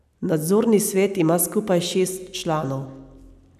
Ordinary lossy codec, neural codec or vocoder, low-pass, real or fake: none; none; 14.4 kHz; real